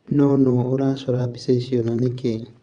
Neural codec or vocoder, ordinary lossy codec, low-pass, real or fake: vocoder, 22.05 kHz, 80 mel bands, WaveNeXt; Opus, 64 kbps; 9.9 kHz; fake